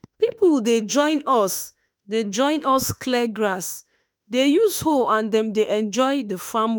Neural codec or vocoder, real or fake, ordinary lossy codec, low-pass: autoencoder, 48 kHz, 32 numbers a frame, DAC-VAE, trained on Japanese speech; fake; none; none